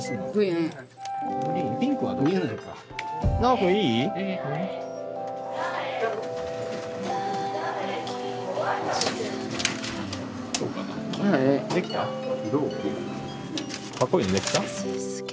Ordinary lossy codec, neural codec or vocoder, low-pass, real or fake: none; none; none; real